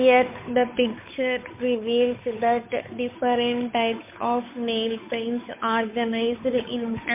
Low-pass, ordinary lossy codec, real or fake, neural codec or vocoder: 3.6 kHz; MP3, 24 kbps; fake; codec, 16 kHz, 8 kbps, FunCodec, trained on Chinese and English, 25 frames a second